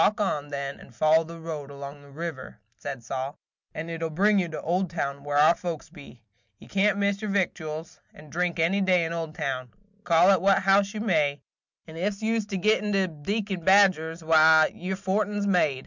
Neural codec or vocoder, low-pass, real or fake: none; 7.2 kHz; real